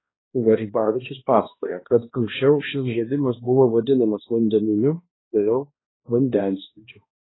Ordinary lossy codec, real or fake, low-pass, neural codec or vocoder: AAC, 16 kbps; fake; 7.2 kHz; codec, 16 kHz, 1 kbps, X-Codec, HuBERT features, trained on balanced general audio